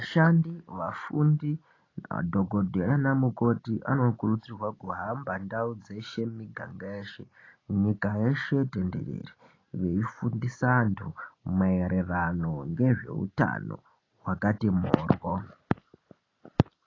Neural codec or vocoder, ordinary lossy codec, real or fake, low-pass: none; AAC, 32 kbps; real; 7.2 kHz